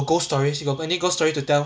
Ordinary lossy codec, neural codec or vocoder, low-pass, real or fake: none; none; none; real